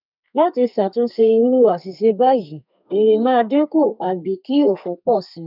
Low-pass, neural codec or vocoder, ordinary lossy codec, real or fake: 5.4 kHz; codec, 32 kHz, 1.9 kbps, SNAC; none; fake